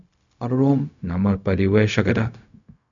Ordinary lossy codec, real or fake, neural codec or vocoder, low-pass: MP3, 96 kbps; fake; codec, 16 kHz, 0.4 kbps, LongCat-Audio-Codec; 7.2 kHz